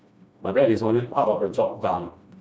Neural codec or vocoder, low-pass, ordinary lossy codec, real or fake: codec, 16 kHz, 1 kbps, FreqCodec, smaller model; none; none; fake